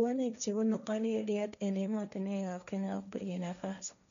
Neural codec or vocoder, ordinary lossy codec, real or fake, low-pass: codec, 16 kHz, 1.1 kbps, Voila-Tokenizer; MP3, 96 kbps; fake; 7.2 kHz